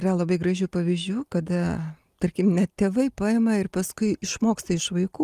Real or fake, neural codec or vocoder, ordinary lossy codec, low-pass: real; none; Opus, 32 kbps; 14.4 kHz